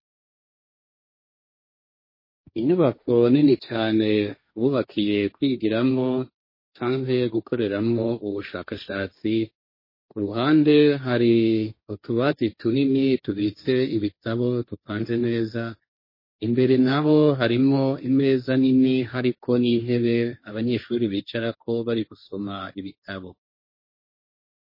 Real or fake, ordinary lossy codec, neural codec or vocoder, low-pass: fake; MP3, 24 kbps; codec, 16 kHz, 1.1 kbps, Voila-Tokenizer; 5.4 kHz